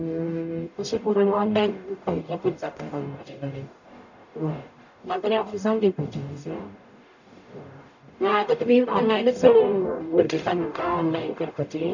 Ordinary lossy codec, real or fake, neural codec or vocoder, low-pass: none; fake; codec, 44.1 kHz, 0.9 kbps, DAC; 7.2 kHz